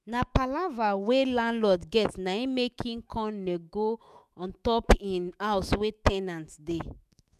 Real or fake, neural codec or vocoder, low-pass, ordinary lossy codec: fake; autoencoder, 48 kHz, 128 numbers a frame, DAC-VAE, trained on Japanese speech; 14.4 kHz; none